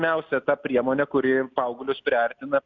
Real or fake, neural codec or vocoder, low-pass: real; none; 7.2 kHz